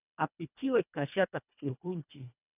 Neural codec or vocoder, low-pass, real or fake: codec, 24 kHz, 1.5 kbps, HILCodec; 3.6 kHz; fake